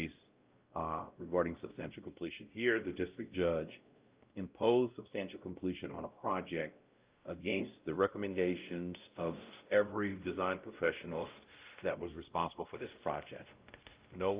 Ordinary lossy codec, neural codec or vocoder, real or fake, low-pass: Opus, 24 kbps; codec, 16 kHz, 0.5 kbps, X-Codec, WavLM features, trained on Multilingual LibriSpeech; fake; 3.6 kHz